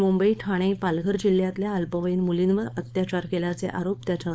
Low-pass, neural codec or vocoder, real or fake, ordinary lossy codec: none; codec, 16 kHz, 4.8 kbps, FACodec; fake; none